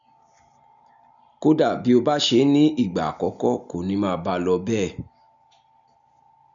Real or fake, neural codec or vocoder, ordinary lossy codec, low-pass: real; none; none; 7.2 kHz